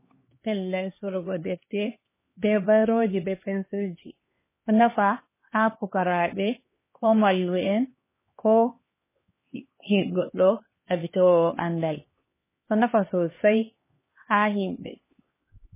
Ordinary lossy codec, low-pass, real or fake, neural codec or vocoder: MP3, 16 kbps; 3.6 kHz; fake; codec, 16 kHz, 2 kbps, X-Codec, HuBERT features, trained on LibriSpeech